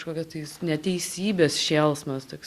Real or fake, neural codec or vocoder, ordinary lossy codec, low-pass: real; none; Opus, 64 kbps; 14.4 kHz